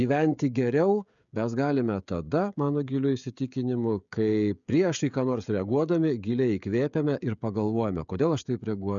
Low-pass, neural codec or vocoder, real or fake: 7.2 kHz; codec, 16 kHz, 16 kbps, FreqCodec, smaller model; fake